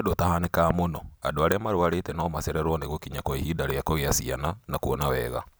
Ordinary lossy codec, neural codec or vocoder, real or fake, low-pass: none; none; real; none